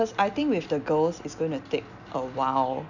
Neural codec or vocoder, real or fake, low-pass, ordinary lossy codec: none; real; 7.2 kHz; none